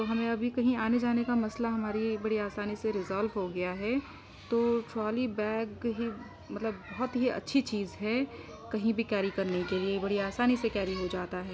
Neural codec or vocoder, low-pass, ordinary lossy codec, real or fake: none; none; none; real